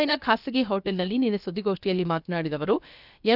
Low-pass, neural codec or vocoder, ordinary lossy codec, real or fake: 5.4 kHz; codec, 16 kHz, about 1 kbps, DyCAST, with the encoder's durations; none; fake